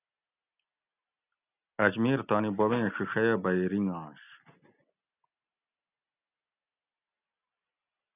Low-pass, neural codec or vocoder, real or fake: 3.6 kHz; none; real